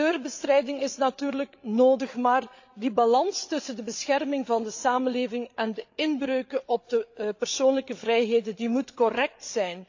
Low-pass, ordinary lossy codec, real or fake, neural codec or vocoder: 7.2 kHz; AAC, 48 kbps; fake; codec, 16 kHz, 8 kbps, FreqCodec, larger model